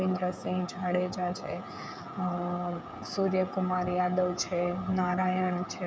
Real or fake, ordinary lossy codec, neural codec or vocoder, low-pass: fake; none; codec, 16 kHz, 16 kbps, FreqCodec, smaller model; none